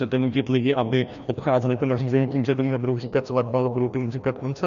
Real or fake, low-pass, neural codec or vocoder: fake; 7.2 kHz; codec, 16 kHz, 1 kbps, FreqCodec, larger model